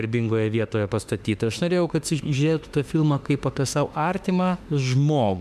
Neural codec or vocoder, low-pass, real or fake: autoencoder, 48 kHz, 32 numbers a frame, DAC-VAE, trained on Japanese speech; 14.4 kHz; fake